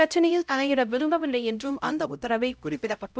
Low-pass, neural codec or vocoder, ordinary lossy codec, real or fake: none; codec, 16 kHz, 0.5 kbps, X-Codec, HuBERT features, trained on LibriSpeech; none; fake